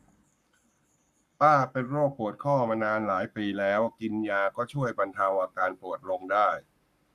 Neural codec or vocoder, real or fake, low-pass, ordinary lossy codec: codec, 44.1 kHz, 7.8 kbps, Pupu-Codec; fake; 14.4 kHz; none